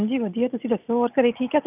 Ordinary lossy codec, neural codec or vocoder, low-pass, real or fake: none; none; 3.6 kHz; real